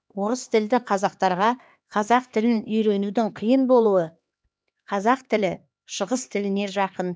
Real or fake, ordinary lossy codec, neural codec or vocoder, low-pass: fake; none; codec, 16 kHz, 2 kbps, X-Codec, HuBERT features, trained on LibriSpeech; none